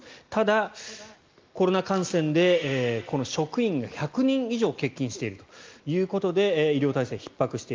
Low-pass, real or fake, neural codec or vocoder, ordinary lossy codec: 7.2 kHz; real; none; Opus, 32 kbps